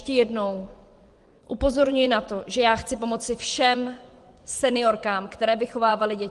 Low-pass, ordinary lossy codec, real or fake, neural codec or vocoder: 10.8 kHz; Opus, 24 kbps; fake; vocoder, 24 kHz, 100 mel bands, Vocos